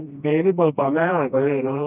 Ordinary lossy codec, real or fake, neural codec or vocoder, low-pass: none; fake; codec, 16 kHz, 1 kbps, FreqCodec, smaller model; 3.6 kHz